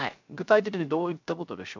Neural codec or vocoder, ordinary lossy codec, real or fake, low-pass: codec, 16 kHz, 0.3 kbps, FocalCodec; MP3, 64 kbps; fake; 7.2 kHz